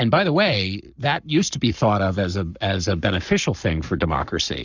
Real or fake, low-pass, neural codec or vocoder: fake; 7.2 kHz; codec, 44.1 kHz, 7.8 kbps, Pupu-Codec